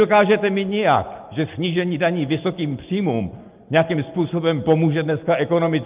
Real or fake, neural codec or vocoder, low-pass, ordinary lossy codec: real; none; 3.6 kHz; Opus, 32 kbps